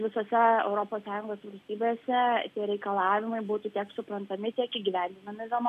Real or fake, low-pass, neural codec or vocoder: real; 14.4 kHz; none